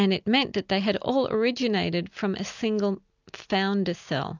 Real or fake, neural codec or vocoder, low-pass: real; none; 7.2 kHz